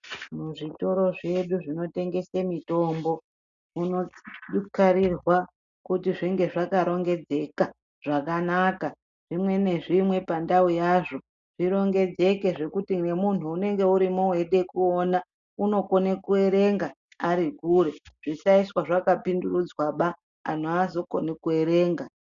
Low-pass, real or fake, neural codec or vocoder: 7.2 kHz; real; none